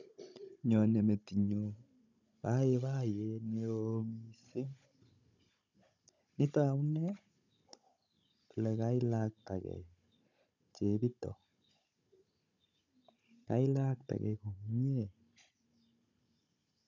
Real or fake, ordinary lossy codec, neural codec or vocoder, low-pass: fake; none; codec, 16 kHz, 16 kbps, FunCodec, trained on Chinese and English, 50 frames a second; 7.2 kHz